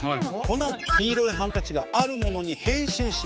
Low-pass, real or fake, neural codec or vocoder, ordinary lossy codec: none; fake; codec, 16 kHz, 4 kbps, X-Codec, HuBERT features, trained on general audio; none